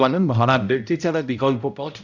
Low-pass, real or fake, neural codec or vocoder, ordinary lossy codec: 7.2 kHz; fake; codec, 16 kHz, 0.5 kbps, X-Codec, HuBERT features, trained on balanced general audio; none